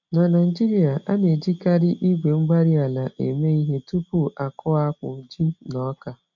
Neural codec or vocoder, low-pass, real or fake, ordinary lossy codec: none; 7.2 kHz; real; none